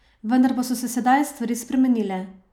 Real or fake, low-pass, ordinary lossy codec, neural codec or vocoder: real; 19.8 kHz; none; none